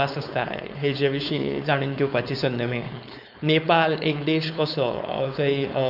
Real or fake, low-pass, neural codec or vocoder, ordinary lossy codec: fake; 5.4 kHz; codec, 16 kHz, 4.8 kbps, FACodec; none